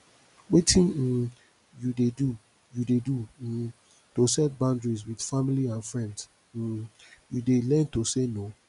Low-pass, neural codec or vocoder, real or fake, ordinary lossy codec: 10.8 kHz; none; real; none